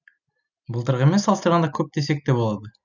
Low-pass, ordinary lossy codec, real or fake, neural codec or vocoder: 7.2 kHz; none; real; none